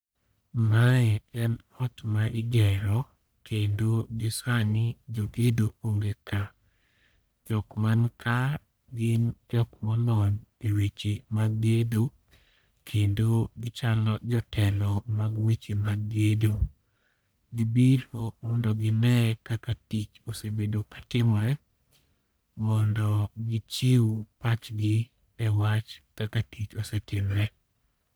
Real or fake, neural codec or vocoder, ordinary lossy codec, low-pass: fake; codec, 44.1 kHz, 1.7 kbps, Pupu-Codec; none; none